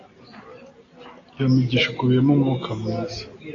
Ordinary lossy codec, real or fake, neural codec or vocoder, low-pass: AAC, 32 kbps; real; none; 7.2 kHz